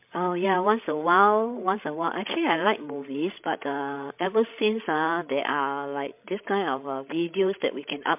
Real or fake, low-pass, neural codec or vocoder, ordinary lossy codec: fake; 3.6 kHz; codec, 16 kHz, 16 kbps, FreqCodec, larger model; MP3, 32 kbps